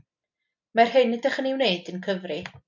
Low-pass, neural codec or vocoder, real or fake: 7.2 kHz; none; real